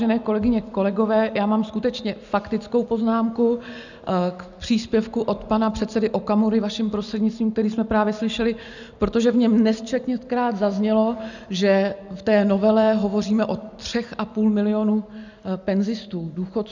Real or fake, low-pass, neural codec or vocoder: real; 7.2 kHz; none